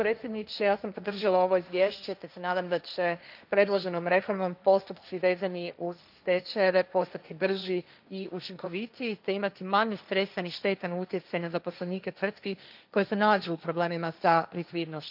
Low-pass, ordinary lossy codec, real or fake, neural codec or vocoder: 5.4 kHz; none; fake; codec, 16 kHz, 1.1 kbps, Voila-Tokenizer